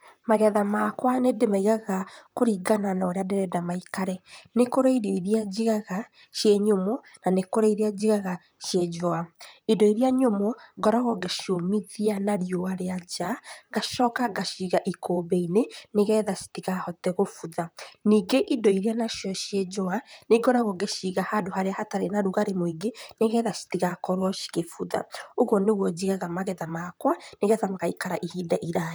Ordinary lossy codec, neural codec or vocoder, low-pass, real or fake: none; vocoder, 44.1 kHz, 128 mel bands, Pupu-Vocoder; none; fake